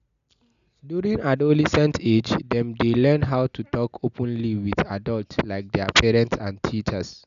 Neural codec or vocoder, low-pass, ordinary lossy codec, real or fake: none; 7.2 kHz; none; real